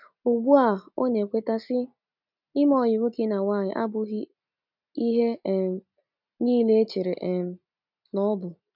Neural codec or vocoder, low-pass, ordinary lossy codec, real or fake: none; 5.4 kHz; none; real